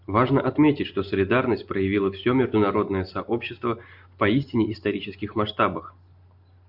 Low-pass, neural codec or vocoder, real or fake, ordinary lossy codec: 5.4 kHz; none; real; Opus, 64 kbps